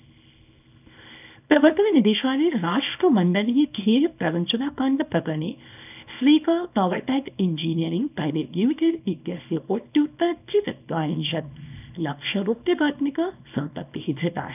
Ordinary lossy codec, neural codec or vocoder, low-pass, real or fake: none; codec, 24 kHz, 0.9 kbps, WavTokenizer, small release; 3.6 kHz; fake